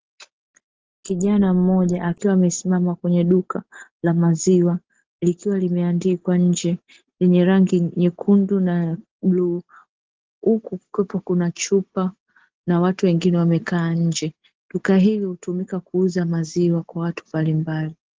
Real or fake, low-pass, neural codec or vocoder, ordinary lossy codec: real; 7.2 kHz; none; Opus, 16 kbps